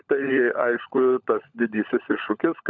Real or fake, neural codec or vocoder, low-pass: fake; codec, 16 kHz, 16 kbps, FunCodec, trained on LibriTTS, 50 frames a second; 7.2 kHz